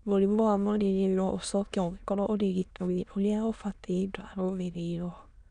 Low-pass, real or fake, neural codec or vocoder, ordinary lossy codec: 9.9 kHz; fake; autoencoder, 22.05 kHz, a latent of 192 numbers a frame, VITS, trained on many speakers; none